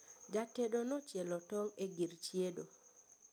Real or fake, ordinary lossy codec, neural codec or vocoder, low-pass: real; none; none; none